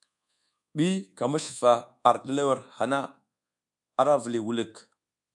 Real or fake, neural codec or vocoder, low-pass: fake; codec, 24 kHz, 1.2 kbps, DualCodec; 10.8 kHz